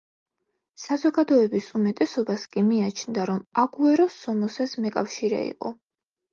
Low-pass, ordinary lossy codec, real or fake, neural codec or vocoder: 7.2 kHz; Opus, 32 kbps; real; none